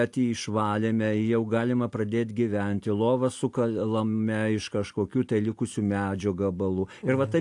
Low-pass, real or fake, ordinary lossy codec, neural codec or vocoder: 10.8 kHz; real; AAC, 64 kbps; none